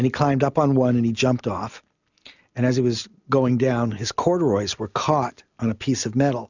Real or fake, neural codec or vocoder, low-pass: real; none; 7.2 kHz